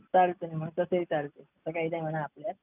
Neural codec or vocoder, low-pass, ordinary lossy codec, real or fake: none; 3.6 kHz; none; real